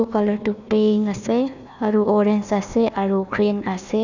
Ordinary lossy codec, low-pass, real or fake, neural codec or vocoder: none; 7.2 kHz; fake; codec, 16 kHz, 1 kbps, FunCodec, trained on Chinese and English, 50 frames a second